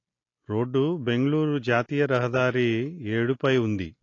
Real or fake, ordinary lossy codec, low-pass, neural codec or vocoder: real; AAC, 32 kbps; 7.2 kHz; none